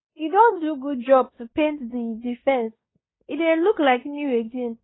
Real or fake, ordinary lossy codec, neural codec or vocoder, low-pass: fake; AAC, 16 kbps; codec, 16 kHz in and 24 kHz out, 0.9 kbps, LongCat-Audio-Codec, fine tuned four codebook decoder; 7.2 kHz